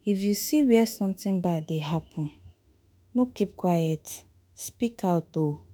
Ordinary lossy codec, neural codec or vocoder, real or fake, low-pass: none; autoencoder, 48 kHz, 32 numbers a frame, DAC-VAE, trained on Japanese speech; fake; none